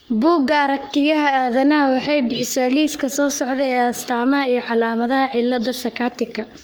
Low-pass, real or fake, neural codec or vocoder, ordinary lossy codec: none; fake; codec, 44.1 kHz, 3.4 kbps, Pupu-Codec; none